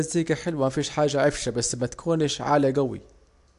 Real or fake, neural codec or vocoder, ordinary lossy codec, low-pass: real; none; AAC, 64 kbps; 10.8 kHz